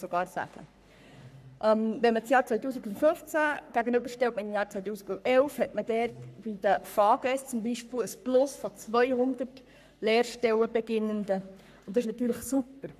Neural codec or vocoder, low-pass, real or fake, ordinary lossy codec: codec, 44.1 kHz, 3.4 kbps, Pupu-Codec; 14.4 kHz; fake; none